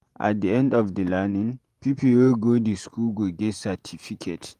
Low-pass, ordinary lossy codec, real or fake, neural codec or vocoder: 14.4 kHz; Opus, 32 kbps; fake; vocoder, 48 kHz, 128 mel bands, Vocos